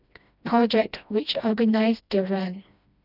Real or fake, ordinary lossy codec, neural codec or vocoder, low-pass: fake; none; codec, 16 kHz, 1 kbps, FreqCodec, smaller model; 5.4 kHz